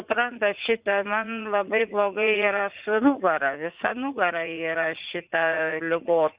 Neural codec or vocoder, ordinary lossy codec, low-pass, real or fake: vocoder, 44.1 kHz, 80 mel bands, Vocos; Opus, 64 kbps; 3.6 kHz; fake